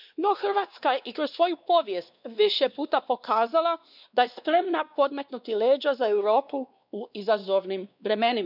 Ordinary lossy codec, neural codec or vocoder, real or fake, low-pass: none; codec, 16 kHz, 2 kbps, X-Codec, WavLM features, trained on Multilingual LibriSpeech; fake; 5.4 kHz